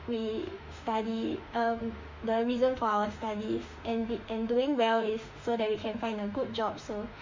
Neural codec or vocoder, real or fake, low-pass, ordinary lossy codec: autoencoder, 48 kHz, 32 numbers a frame, DAC-VAE, trained on Japanese speech; fake; 7.2 kHz; MP3, 48 kbps